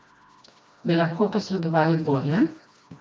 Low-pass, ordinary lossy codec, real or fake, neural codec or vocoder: none; none; fake; codec, 16 kHz, 1 kbps, FreqCodec, smaller model